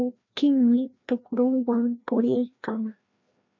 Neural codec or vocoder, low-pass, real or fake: codec, 16 kHz, 1 kbps, FreqCodec, larger model; 7.2 kHz; fake